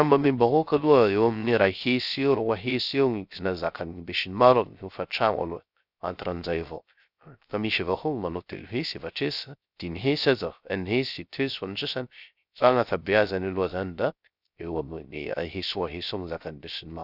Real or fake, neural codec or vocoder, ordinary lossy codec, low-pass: fake; codec, 16 kHz, 0.3 kbps, FocalCodec; none; 5.4 kHz